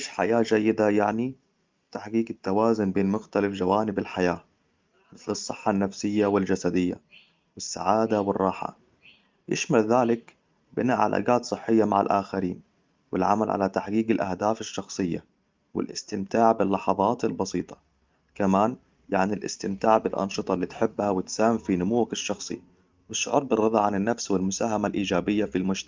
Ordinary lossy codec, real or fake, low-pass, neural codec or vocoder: Opus, 24 kbps; real; 7.2 kHz; none